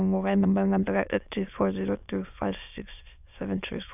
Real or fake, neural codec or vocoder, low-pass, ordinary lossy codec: fake; autoencoder, 22.05 kHz, a latent of 192 numbers a frame, VITS, trained on many speakers; 3.6 kHz; none